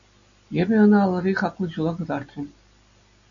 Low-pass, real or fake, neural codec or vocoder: 7.2 kHz; real; none